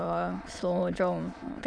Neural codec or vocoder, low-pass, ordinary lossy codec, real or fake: autoencoder, 22.05 kHz, a latent of 192 numbers a frame, VITS, trained on many speakers; 9.9 kHz; none; fake